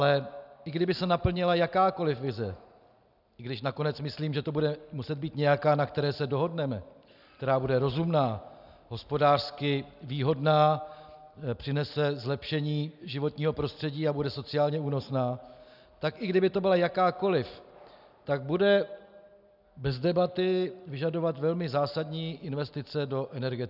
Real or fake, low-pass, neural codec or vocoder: real; 5.4 kHz; none